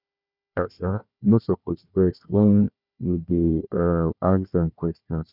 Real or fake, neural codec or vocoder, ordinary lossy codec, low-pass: fake; codec, 16 kHz, 1 kbps, FunCodec, trained on Chinese and English, 50 frames a second; none; 5.4 kHz